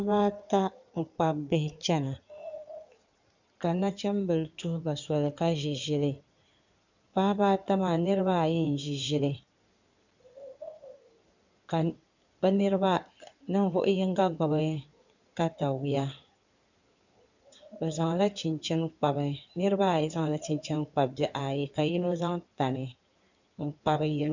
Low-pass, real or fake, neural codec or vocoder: 7.2 kHz; fake; codec, 16 kHz in and 24 kHz out, 2.2 kbps, FireRedTTS-2 codec